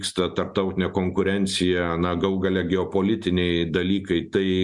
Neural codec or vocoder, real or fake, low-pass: none; real; 10.8 kHz